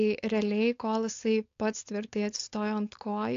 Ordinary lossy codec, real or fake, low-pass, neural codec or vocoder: AAC, 48 kbps; fake; 7.2 kHz; codec, 16 kHz, 4.8 kbps, FACodec